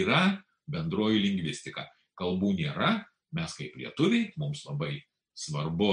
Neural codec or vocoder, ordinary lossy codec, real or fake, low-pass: none; MP3, 64 kbps; real; 9.9 kHz